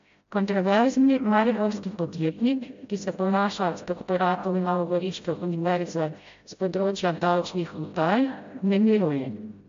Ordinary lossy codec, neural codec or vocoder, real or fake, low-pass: MP3, 48 kbps; codec, 16 kHz, 0.5 kbps, FreqCodec, smaller model; fake; 7.2 kHz